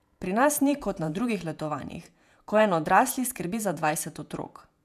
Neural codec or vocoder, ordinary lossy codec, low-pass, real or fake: vocoder, 48 kHz, 128 mel bands, Vocos; none; 14.4 kHz; fake